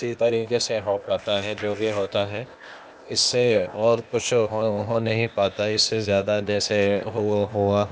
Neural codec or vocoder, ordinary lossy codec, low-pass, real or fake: codec, 16 kHz, 0.8 kbps, ZipCodec; none; none; fake